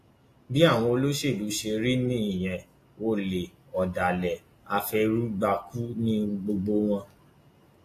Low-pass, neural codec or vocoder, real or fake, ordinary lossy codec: 14.4 kHz; none; real; AAC, 48 kbps